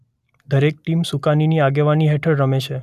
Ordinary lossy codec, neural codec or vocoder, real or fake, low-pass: none; none; real; 14.4 kHz